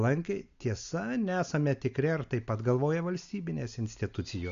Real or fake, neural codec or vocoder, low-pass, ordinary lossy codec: real; none; 7.2 kHz; MP3, 64 kbps